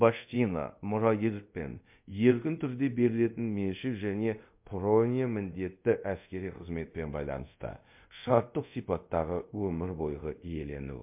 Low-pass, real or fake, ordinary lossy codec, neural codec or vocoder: 3.6 kHz; fake; MP3, 32 kbps; codec, 24 kHz, 0.5 kbps, DualCodec